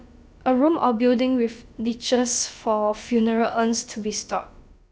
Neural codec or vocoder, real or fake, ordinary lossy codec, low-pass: codec, 16 kHz, about 1 kbps, DyCAST, with the encoder's durations; fake; none; none